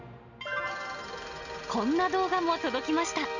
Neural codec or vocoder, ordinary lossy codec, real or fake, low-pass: none; none; real; 7.2 kHz